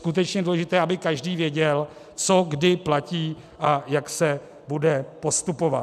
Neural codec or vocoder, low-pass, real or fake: none; 14.4 kHz; real